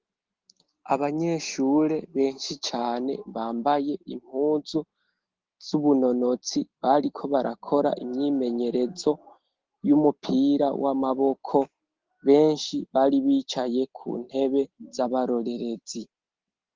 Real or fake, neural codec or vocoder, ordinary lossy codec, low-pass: real; none; Opus, 16 kbps; 7.2 kHz